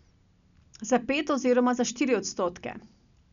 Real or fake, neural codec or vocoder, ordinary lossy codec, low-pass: real; none; none; 7.2 kHz